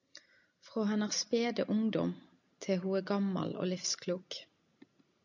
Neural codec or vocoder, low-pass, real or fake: none; 7.2 kHz; real